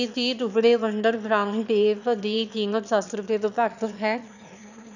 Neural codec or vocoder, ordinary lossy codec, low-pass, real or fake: autoencoder, 22.05 kHz, a latent of 192 numbers a frame, VITS, trained on one speaker; none; 7.2 kHz; fake